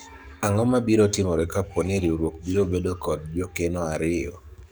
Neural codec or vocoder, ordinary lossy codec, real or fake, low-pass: codec, 44.1 kHz, 7.8 kbps, DAC; none; fake; none